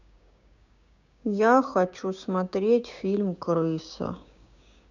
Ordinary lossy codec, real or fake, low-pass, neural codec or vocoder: none; fake; 7.2 kHz; codec, 16 kHz, 6 kbps, DAC